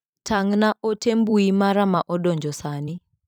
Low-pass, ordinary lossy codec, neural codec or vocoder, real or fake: none; none; vocoder, 44.1 kHz, 128 mel bands every 256 samples, BigVGAN v2; fake